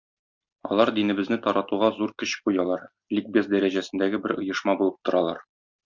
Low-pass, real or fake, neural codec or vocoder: 7.2 kHz; real; none